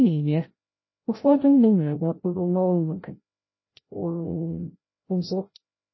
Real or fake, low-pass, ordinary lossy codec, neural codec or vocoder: fake; 7.2 kHz; MP3, 24 kbps; codec, 16 kHz, 0.5 kbps, FreqCodec, larger model